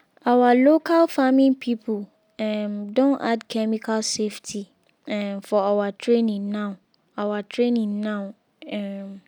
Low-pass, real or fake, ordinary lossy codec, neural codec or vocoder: 19.8 kHz; real; none; none